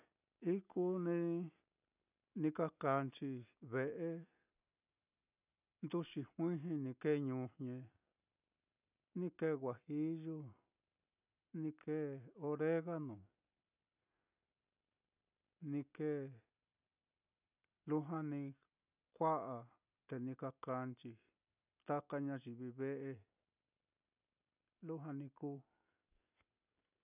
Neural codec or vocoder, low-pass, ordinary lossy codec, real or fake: none; 3.6 kHz; none; real